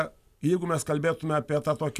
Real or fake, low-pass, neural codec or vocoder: real; 14.4 kHz; none